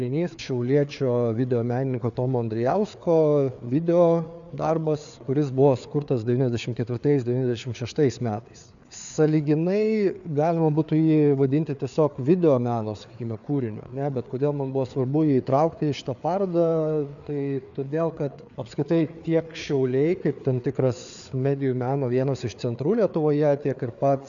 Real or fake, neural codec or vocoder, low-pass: fake; codec, 16 kHz, 4 kbps, FreqCodec, larger model; 7.2 kHz